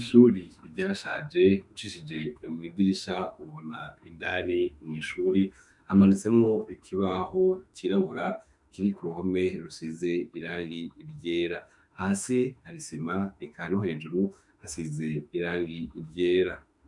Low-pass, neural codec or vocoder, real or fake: 10.8 kHz; autoencoder, 48 kHz, 32 numbers a frame, DAC-VAE, trained on Japanese speech; fake